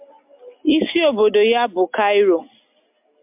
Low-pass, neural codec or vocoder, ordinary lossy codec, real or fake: 3.6 kHz; none; MP3, 32 kbps; real